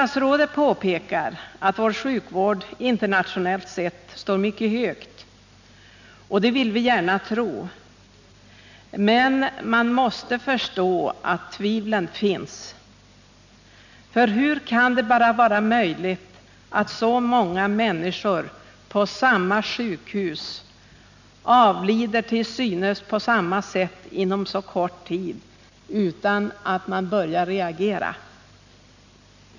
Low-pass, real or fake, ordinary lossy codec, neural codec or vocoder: 7.2 kHz; real; none; none